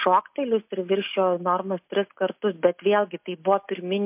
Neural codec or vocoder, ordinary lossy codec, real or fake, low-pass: none; MP3, 32 kbps; real; 3.6 kHz